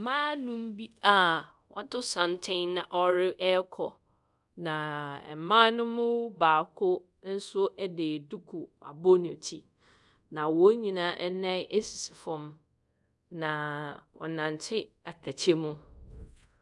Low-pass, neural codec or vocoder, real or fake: 10.8 kHz; codec, 24 kHz, 0.5 kbps, DualCodec; fake